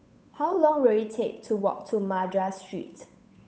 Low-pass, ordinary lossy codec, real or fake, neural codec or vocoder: none; none; fake; codec, 16 kHz, 8 kbps, FunCodec, trained on Chinese and English, 25 frames a second